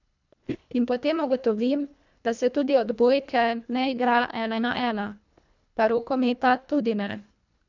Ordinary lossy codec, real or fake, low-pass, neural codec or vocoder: none; fake; 7.2 kHz; codec, 24 kHz, 1.5 kbps, HILCodec